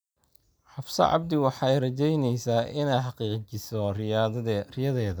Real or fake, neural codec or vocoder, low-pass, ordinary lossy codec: real; none; none; none